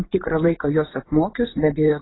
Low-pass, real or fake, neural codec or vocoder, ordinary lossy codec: 7.2 kHz; fake; vocoder, 24 kHz, 100 mel bands, Vocos; AAC, 16 kbps